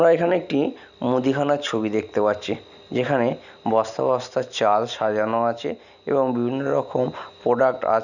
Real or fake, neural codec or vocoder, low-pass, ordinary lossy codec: real; none; 7.2 kHz; none